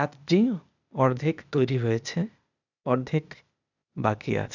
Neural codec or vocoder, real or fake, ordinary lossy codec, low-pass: codec, 16 kHz, 0.8 kbps, ZipCodec; fake; none; 7.2 kHz